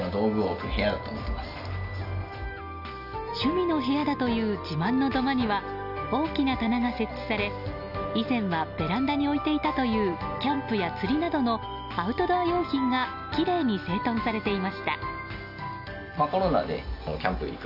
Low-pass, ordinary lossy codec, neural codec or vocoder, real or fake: 5.4 kHz; none; none; real